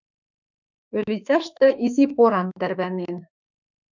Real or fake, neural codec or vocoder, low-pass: fake; autoencoder, 48 kHz, 32 numbers a frame, DAC-VAE, trained on Japanese speech; 7.2 kHz